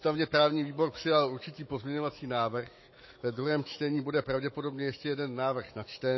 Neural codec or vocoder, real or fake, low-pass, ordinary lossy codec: autoencoder, 48 kHz, 128 numbers a frame, DAC-VAE, trained on Japanese speech; fake; 7.2 kHz; MP3, 24 kbps